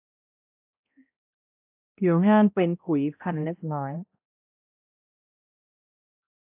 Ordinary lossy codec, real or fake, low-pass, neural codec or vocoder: AAC, 32 kbps; fake; 3.6 kHz; codec, 16 kHz, 0.5 kbps, X-Codec, HuBERT features, trained on balanced general audio